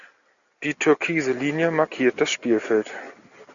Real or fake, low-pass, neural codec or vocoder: real; 7.2 kHz; none